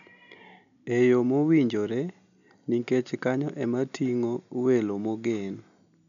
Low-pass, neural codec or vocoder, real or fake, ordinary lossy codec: 7.2 kHz; none; real; none